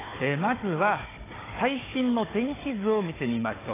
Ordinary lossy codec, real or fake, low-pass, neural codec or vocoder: AAC, 16 kbps; fake; 3.6 kHz; codec, 16 kHz, 4 kbps, FunCodec, trained on Chinese and English, 50 frames a second